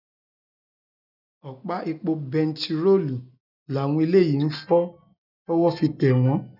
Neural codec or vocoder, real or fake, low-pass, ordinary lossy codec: none; real; 5.4 kHz; none